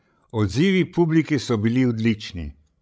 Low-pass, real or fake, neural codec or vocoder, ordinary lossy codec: none; fake; codec, 16 kHz, 16 kbps, FreqCodec, larger model; none